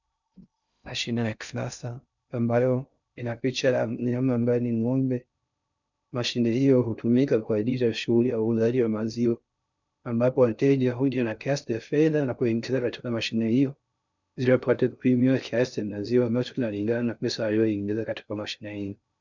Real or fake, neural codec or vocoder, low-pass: fake; codec, 16 kHz in and 24 kHz out, 0.6 kbps, FocalCodec, streaming, 2048 codes; 7.2 kHz